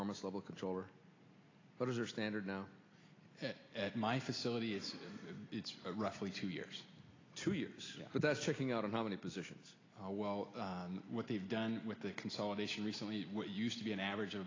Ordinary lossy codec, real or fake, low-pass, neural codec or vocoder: AAC, 32 kbps; real; 7.2 kHz; none